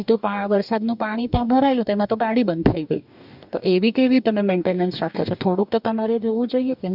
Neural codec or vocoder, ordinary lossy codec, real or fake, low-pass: codec, 44.1 kHz, 2.6 kbps, DAC; none; fake; 5.4 kHz